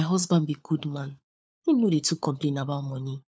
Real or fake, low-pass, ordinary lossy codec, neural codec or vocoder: fake; none; none; codec, 16 kHz, 4 kbps, FunCodec, trained on LibriTTS, 50 frames a second